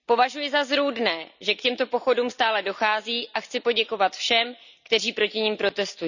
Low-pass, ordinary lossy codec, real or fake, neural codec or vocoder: 7.2 kHz; none; real; none